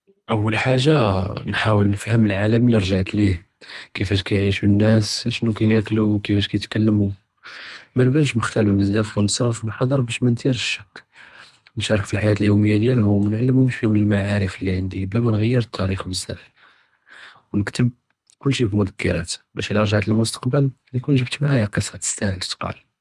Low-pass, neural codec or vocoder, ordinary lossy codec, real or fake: none; codec, 24 kHz, 3 kbps, HILCodec; none; fake